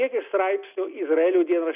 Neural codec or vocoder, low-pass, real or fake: none; 3.6 kHz; real